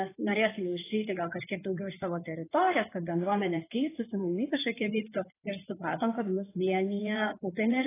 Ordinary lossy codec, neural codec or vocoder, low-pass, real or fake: AAC, 16 kbps; codec, 16 kHz in and 24 kHz out, 2.2 kbps, FireRedTTS-2 codec; 3.6 kHz; fake